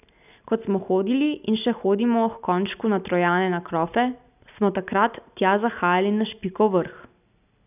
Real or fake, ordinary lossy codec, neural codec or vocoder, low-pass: real; none; none; 3.6 kHz